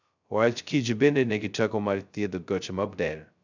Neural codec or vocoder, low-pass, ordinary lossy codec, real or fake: codec, 16 kHz, 0.2 kbps, FocalCodec; 7.2 kHz; none; fake